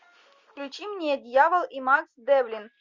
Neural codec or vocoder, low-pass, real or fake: none; 7.2 kHz; real